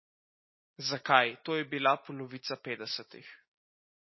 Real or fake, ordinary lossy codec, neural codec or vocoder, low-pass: real; MP3, 24 kbps; none; 7.2 kHz